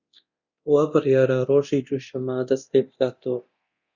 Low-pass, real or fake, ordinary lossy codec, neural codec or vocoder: 7.2 kHz; fake; Opus, 64 kbps; codec, 24 kHz, 0.9 kbps, DualCodec